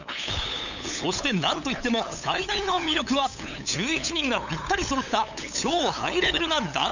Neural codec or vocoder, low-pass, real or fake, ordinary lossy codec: codec, 16 kHz, 8 kbps, FunCodec, trained on LibriTTS, 25 frames a second; 7.2 kHz; fake; none